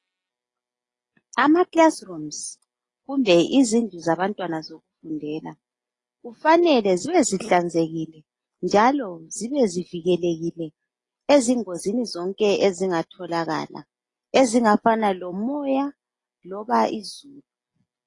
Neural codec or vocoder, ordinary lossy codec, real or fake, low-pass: none; AAC, 32 kbps; real; 10.8 kHz